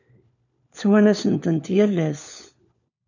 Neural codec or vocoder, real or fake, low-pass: codec, 16 kHz, 4 kbps, FunCodec, trained on LibriTTS, 50 frames a second; fake; 7.2 kHz